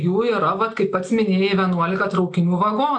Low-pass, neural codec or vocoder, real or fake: 10.8 kHz; none; real